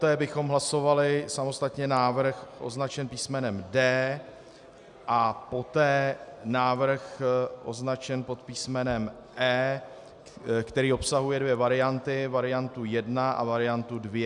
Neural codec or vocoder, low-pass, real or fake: none; 10.8 kHz; real